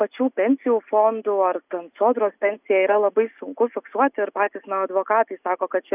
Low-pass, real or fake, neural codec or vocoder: 3.6 kHz; real; none